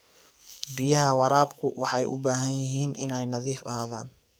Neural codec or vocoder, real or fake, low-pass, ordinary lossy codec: codec, 44.1 kHz, 2.6 kbps, SNAC; fake; none; none